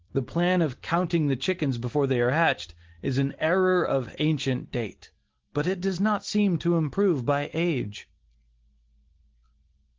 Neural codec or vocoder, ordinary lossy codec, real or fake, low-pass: none; Opus, 16 kbps; real; 7.2 kHz